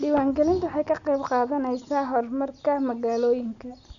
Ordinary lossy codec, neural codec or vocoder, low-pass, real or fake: none; none; 7.2 kHz; real